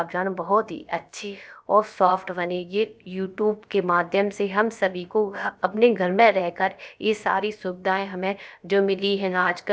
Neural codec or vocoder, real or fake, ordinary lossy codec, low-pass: codec, 16 kHz, about 1 kbps, DyCAST, with the encoder's durations; fake; none; none